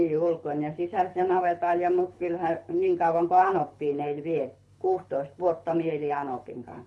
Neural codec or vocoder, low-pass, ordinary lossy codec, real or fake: codec, 24 kHz, 6 kbps, HILCodec; none; none; fake